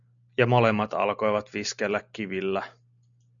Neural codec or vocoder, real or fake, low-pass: none; real; 7.2 kHz